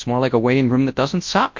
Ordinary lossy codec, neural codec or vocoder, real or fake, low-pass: MP3, 48 kbps; codec, 24 kHz, 0.9 kbps, WavTokenizer, large speech release; fake; 7.2 kHz